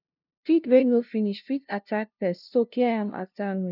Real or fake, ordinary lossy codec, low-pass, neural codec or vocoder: fake; none; 5.4 kHz; codec, 16 kHz, 0.5 kbps, FunCodec, trained on LibriTTS, 25 frames a second